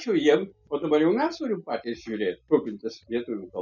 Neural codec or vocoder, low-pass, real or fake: none; 7.2 kHz; real